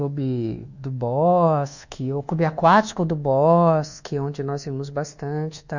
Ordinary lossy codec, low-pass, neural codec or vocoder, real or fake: none; 7.2 kHz; codec, 24 kHz, 1.2 kbps, DualCodec; fake